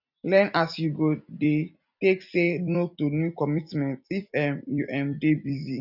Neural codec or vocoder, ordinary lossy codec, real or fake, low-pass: vocoder, 44.1 kHz, 128 mel bands every 256 samples, BigVGAN v2; none; fake; 5.4 kHz